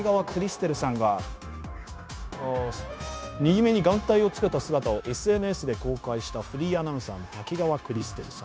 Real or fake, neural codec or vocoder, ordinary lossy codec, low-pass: fake; codec, 16 kHz, 0.9 kbps, LongCat-Audio-Codec; none; none